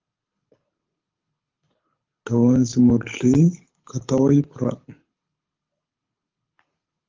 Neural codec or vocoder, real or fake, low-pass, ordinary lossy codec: codec, 24 kHz, 6 kbps, HILCodec; fake; 7.2 kHz; Opus, 32 kbps